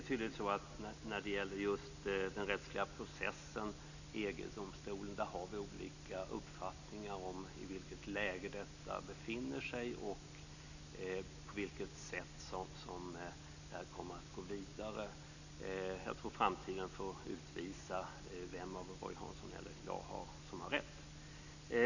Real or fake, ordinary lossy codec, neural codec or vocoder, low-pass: real; none; none; 7.2 kHz